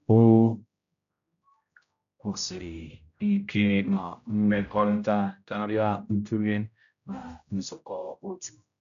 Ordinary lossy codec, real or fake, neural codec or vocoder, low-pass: none; fake; codec, 16 kHz, 0.5 kbps, X-Codec, HuBERT features, trained on general audio; 7.2 kHz